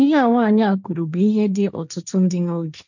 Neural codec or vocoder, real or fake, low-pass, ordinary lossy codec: codec, 16 kHz, 1.1 kbps, Voila-Tokenizer; fake; 7.2 kHz; none